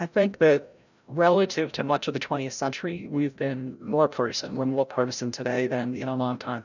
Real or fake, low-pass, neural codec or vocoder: fake; 7.2 kHz; codec, 16 kHz, 0.5 kbps, FreqCodec, larger model